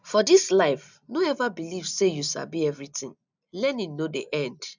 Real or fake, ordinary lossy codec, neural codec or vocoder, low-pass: real; none; none; 7.2 kHz